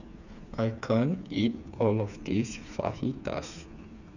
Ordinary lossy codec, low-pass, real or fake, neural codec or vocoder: none; 7.2 kHz; fake; codec, 16 kHz, 4 kbps, FreqCodec, smaller model